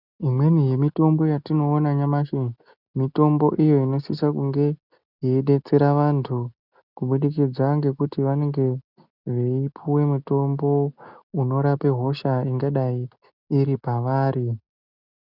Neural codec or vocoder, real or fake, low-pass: none; real; 5.4 kHz